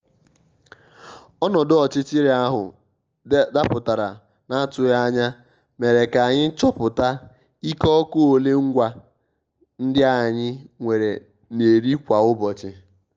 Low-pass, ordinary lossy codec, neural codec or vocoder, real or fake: 7.2 kHz; Opus, 24 kbps; none; real